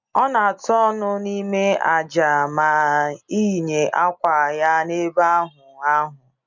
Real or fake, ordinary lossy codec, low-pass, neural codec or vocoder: real; none; 7.2 kHz; none